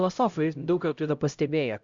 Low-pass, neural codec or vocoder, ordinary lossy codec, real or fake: 7.2 kHz; codec, 16 kHz, 0.5 kbps, X-Codec, HuBERT features, trained on LibriSpeech; Opus, 64 kbps; fake